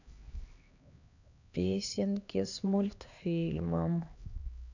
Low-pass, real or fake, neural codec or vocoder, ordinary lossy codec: 7.2 kHz; fake; codec, 16 kHz, 2 kbps, X-Codec, HuBERT features, trained on LibriSpeech; none